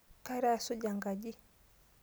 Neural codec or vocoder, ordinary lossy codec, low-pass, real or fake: none; none; none; real